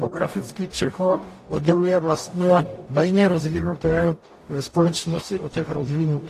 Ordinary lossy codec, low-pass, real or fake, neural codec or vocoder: AAC, 48 kbps; 14.4 kHz; fake; codec, 44.1 kHz, 0.9 kbps, DAC